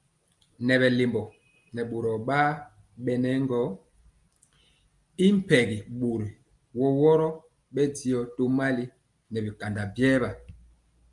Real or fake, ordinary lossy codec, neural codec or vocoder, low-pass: real; Opus, 32 kbps; none; 10.8 kHz